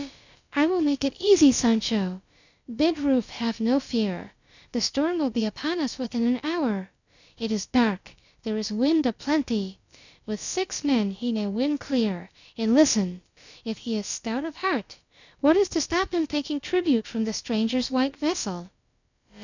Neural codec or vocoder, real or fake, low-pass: codec, 16 kHz, about 1 kbps, DyCAST, with the encoder's durations; fake; 7.2 kHz